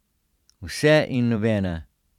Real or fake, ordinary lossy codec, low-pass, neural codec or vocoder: fake; none; 19.8 kHz; vocoder, 44.1 kHz, 128 mel bands every 512 samples, BigVGAN v2